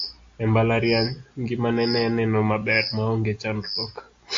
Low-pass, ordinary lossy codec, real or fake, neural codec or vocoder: 7.2 kHz; AAC, 48 kbps; real; none